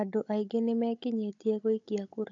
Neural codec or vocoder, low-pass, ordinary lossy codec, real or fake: codec, 16 kHz, 16 kbps, FunCodec, trained on Chinese and English, 50 frames a second; 7.2 kHz; AAC, 48 kbps; fake